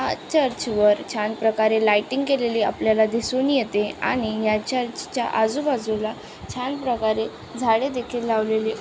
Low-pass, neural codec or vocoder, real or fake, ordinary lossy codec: none; none; real; none